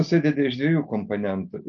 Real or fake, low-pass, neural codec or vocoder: real; 7.2 kHz; none